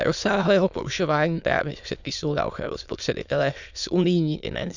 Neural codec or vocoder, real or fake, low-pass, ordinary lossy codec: autoencoder, 22.05 kHz, a latent of 192 numbers a frame, VITS, trained on many speakers; fake; 7.2 kHz; none